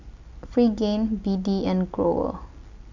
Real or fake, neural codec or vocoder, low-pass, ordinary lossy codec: real; none; 7.2 kHz; none